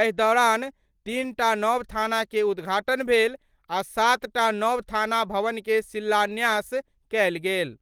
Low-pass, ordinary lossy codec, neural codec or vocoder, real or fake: 19.8 kHz; Opus, 24 kbps; none; real